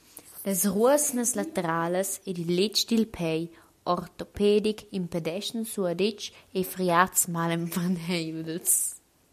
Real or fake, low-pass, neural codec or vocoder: real; 14.4 kHz; none